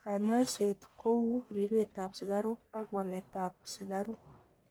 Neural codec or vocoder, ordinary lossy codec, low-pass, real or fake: codec, 44.1 kHz, 1.7 kbps, Pupu-Codec; none; none; fake